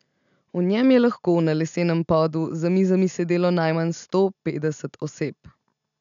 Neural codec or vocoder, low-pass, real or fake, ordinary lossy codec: none; 7.2 kHz; real; none